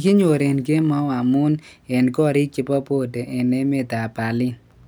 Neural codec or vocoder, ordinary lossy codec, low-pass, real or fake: none; none; none; real